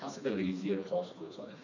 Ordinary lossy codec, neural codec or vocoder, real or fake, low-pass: none; codec, 16 kHz, 2 kbps, FreqCodec, smaller model; fake; 7.2 kHz